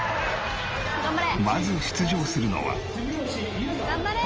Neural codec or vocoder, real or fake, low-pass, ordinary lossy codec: none; real; 7.2 kHz; Opus, 24 kbps